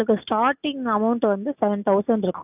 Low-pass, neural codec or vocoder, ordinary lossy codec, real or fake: 3.6 kHz; none; none; real